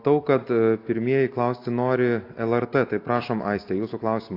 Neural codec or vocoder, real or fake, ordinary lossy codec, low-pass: none; real; AAC, 32 kbps; 5.4 kHz